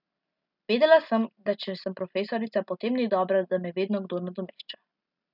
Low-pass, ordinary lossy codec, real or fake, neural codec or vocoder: 5.4 kHz; none; real; none